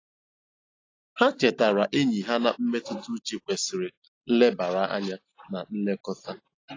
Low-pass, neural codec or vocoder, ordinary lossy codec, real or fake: 7.2 kHz; none; AAC, 32 kbps; real